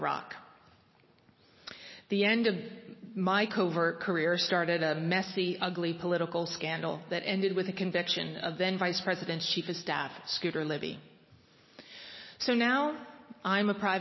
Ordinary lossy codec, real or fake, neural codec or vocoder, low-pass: MP3, 24 kbps; real; none; 7.2 kHz